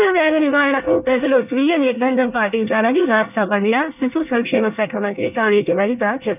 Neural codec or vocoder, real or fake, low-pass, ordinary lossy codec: codec, 24 kHz, 1 kbps, SNAC; fake; 3.6 kHz; none